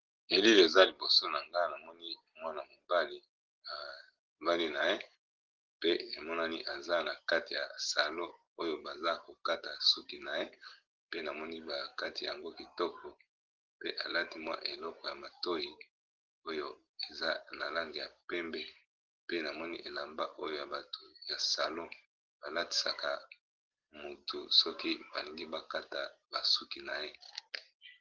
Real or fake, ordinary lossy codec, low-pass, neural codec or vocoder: real; Opus, 16 kbps; 7.2 kHz; none